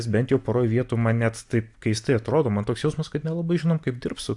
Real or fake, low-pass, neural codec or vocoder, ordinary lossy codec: real; 10.8 kHz; none; AAC, 64 kbps